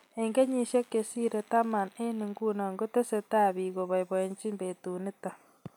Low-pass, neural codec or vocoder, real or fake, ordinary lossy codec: none; none; real; none